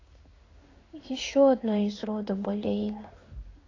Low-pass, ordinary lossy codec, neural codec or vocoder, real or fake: 7.2 kHz; AAC, 32 kbps; codec, 16 kHz in and 24 kHz out, 1 kbps, XY-Tokenizer; fake